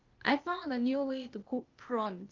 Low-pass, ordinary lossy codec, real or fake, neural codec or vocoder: 7.2 kHz; Opus, 24 kbps; fake; codec, 16 kHz, 0.8 kbps, ZipCodec